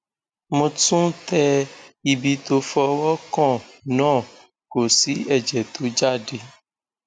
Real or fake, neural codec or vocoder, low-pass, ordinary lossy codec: real; none; 9.9 kHz; none